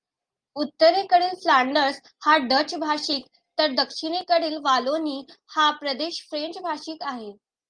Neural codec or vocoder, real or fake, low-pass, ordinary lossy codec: none; real; 9.9 kHz; Opus, 32 kbps